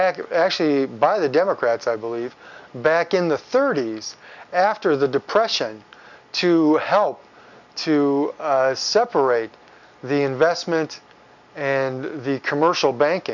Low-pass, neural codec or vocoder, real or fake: 7.2 kHz; none; real